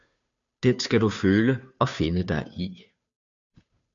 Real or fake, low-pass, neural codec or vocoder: fake; 7.2 kHz; codec, 16 kHz, 2 kbps, FunCodec, trained on Chinese and English, 25 frames a second